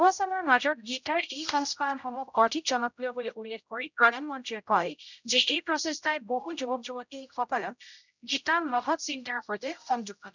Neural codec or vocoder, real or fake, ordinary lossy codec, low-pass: codec, 16 kHz, 0.5 kbps, X-Codec, HuBERT features, trained on general audio; fake; none; 7.2 kHz